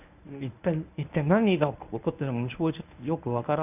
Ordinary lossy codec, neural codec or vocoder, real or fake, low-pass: none; codec, 24 kHz, 0.9 kbps, WavTokenizer, medium speech release version 1; fake; 3.6 kHz